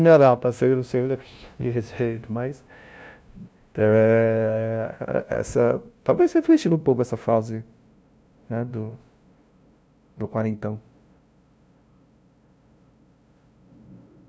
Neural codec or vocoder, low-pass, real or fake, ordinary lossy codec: codec, 16 kHz, 0.5 kbps, FunCodec, trained on LibriTTS, 25 frames a second; none; fake; none